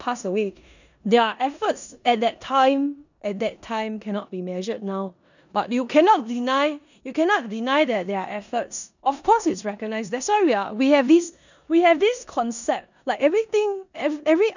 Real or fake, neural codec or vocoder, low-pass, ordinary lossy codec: fake; codec, 16 kHz in and 24 kHz out, 0.9 kbps, LongCat-Audio-Codec, four codebook decoder; 7.2 kHz; none